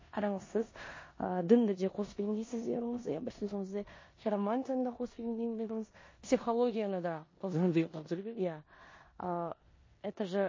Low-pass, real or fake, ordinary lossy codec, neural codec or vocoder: 7.2 kHz; fake; MP3, 32 kbps; codec, 16 kHz in and 24 kHz out, 0.9 kbps, LongCat-Audio-Codec, four codebook decoder